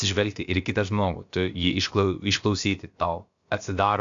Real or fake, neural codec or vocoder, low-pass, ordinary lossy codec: fake; codec, 16 kHz, about 1 kbps, DyCAST, with the encoder's durations; 7.2 kHz; AAC, 64 kbps